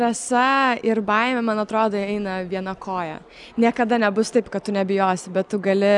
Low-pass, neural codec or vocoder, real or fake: 10.8 kHz; none; real